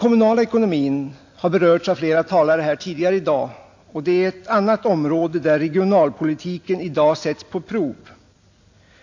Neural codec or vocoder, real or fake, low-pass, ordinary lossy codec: none; real; 7.2 kHz; AAC, 48 kbps